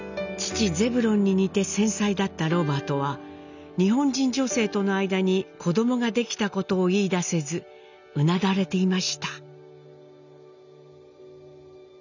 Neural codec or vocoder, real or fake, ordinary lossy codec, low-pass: none; real; none; 7.2 kHz